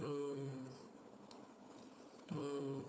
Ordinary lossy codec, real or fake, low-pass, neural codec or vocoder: none; fake; none; codec, 16 kHz, 4 kbps, FunCodec, trained on Chinese and English, 50 frames a second